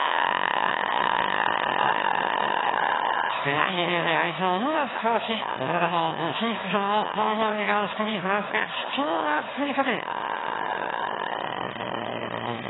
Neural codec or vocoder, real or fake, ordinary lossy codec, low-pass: autoencoder, 22.05 kHz, a latent of 192 numbers a frame, VITS, trained on one speaker; fake; AAC, 16 kbps; 7.2 kHz